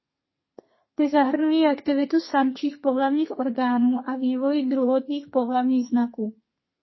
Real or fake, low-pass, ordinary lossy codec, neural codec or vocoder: fake; 7.2 kHz; MP3, 24 kbps; codec, 32 kHz, 1.9 kbps, SNAC